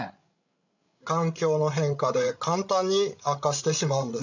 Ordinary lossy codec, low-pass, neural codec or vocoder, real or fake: none; 7.2 kHz; codec, 16 kHz, 16 kbps, FreqCodec, larger model; fake